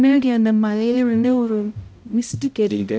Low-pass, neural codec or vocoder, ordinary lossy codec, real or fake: none; codec, 16 kHz, 0.5 kbps, X-Codec, HuBERT features, trained on balanced general audio; none; fake